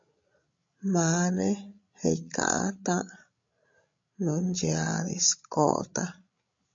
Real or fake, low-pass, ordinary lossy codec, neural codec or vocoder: fake; 7.2 kHz; MP3, 48 kbps; codec, 16 kHz, 16 kbps, FreqCodec, larger model